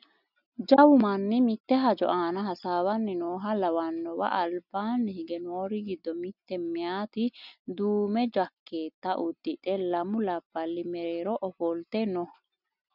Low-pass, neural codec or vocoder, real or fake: 5.4 kHz; none; real